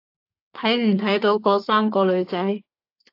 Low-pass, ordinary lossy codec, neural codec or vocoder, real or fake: 5.4 kHz; AAC, 32 kbps; codec, 32 kHz, 1.9 kbps, SNAC; fake